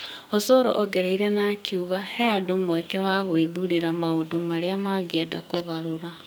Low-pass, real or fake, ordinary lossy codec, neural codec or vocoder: none; fake; none; codec, 44.1 kHz, 2.6 kbps, SNAC